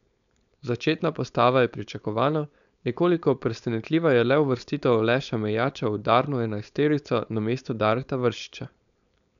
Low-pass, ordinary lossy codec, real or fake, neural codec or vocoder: 7.2 kHz; none; fake; codec, 16 kHz, 4.8 kbps, FACodec